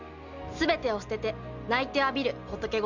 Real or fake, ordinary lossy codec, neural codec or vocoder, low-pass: real; none; none; 7.2 kHz